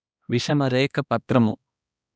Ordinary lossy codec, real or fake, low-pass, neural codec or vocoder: none; fake; none; codec, 16 kHz, 1 kbps, X-Codec, HuBERT features, trained on balanced general audio